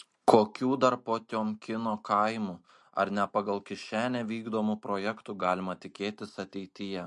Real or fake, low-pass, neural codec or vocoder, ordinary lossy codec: real; 10.8 kHz; none; MP3, 48 kbps